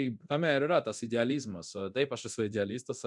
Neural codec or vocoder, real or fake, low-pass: codec, 24 kHz, 0.9 kbps, DualCodec; fake; 10.8 kHz